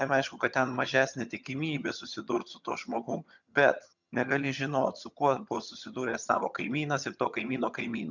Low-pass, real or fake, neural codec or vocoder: 7.2 kHz; fake; vocoder, 22.05 kHz, 80 mel bands, HiFi-GAN